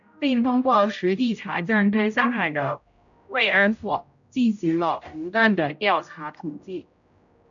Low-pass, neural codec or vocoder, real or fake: 7.2 kHz; codec, 16 kHz, 0.5 kbps, X-Codec, HuBERT features, trained on general audio; fake